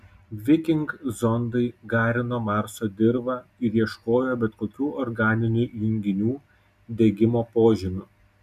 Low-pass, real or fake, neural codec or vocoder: 14.4 kHz; real; none